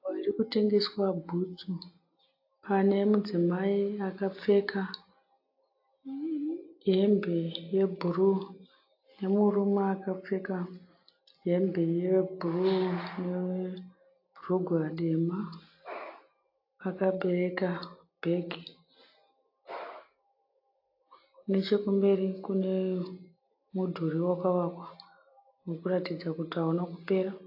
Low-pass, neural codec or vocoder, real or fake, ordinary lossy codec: 5.4 kHz; none; real; AAC, 32 kbps